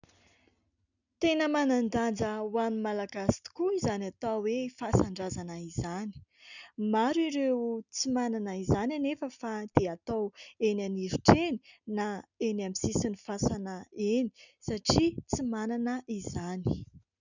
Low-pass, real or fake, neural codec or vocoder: 7.2 kHz; real; none